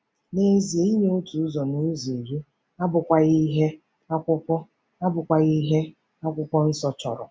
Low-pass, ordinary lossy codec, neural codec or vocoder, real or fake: none; none; none; real